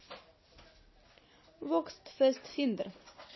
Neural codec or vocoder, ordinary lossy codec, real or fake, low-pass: none; MP3, 24 kbps; real; 7.2 kHz